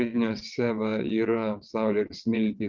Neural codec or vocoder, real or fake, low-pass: vocoder, 22.05 kHz, 80 mel bands, WaveNeXt; fake; 7.2 kHz